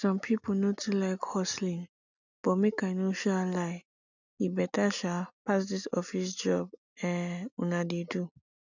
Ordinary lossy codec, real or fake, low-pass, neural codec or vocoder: none; real; 7.2 kHz; none